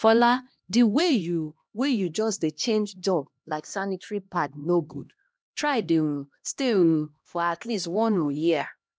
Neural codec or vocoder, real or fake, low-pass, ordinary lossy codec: codec, 16 kHz, 1 kbps, X-Codec, HuBERT features, trained on LibriSpeech; fake; none; none